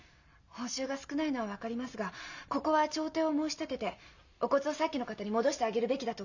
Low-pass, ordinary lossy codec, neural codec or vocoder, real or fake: 7.2 kHz; none; none; real